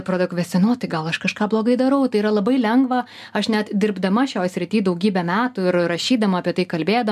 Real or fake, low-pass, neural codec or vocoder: real; 14.4 kHz; none